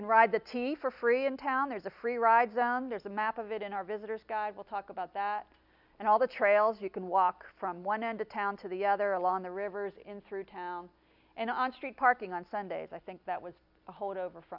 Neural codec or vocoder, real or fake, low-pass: none; real; 5.4 kHz